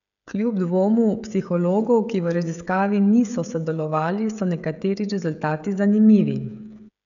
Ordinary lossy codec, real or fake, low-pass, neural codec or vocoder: none; fake; 7.2 kHz; codec, 16 kHz, 16 kbps, FreqCodec, smaller model